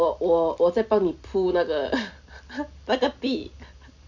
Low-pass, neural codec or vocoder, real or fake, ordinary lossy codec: 7.2 kHz; none; real; none